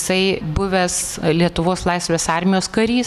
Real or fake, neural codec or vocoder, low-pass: real; none; 14.4 kHz